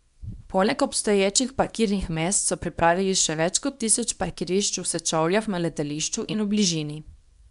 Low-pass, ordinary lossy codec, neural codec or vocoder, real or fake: 10.8 kHz; none; codec, 24 kHz, 0.9 kbps, WavTokenizer, small release; fake